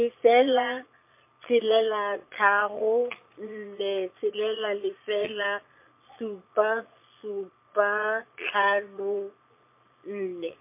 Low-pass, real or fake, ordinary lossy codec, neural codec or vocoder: 3.6 kHz; fake; MP3, 24 kbps; vocoder, 22.05 kHz, 80 mel bands, Vocos